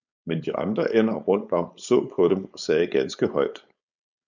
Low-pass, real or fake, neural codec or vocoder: 7.2 kHz; fake; codec, 16 kHz, 4.8 kbps, FACodec